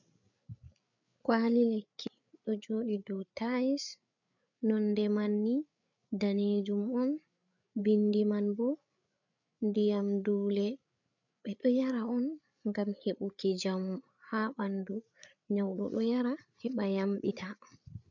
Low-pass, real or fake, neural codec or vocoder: 7.2 kHz; fake; codec, 16 kHz, 8 kbps, FreqCodec, larger model